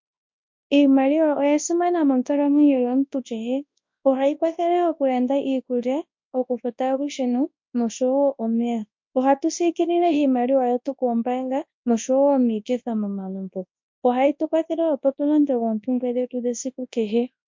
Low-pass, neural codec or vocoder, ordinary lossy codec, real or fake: 7.2 kHz; codec, 24 kHz, 0.9 kbps, WavTokenizer, large speech release; MP3, 48 kbps; fake